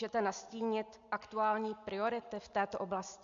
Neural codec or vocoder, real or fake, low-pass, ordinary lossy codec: none; real; 7.2 kHz; MP3, 64 kbps